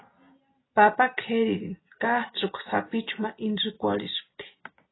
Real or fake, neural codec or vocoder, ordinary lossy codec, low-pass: real; none; AAC, 16 kbps; 7.2 kHz